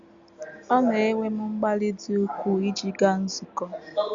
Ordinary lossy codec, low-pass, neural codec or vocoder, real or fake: Opus, 64 kbps; 7.2 kHz; none; real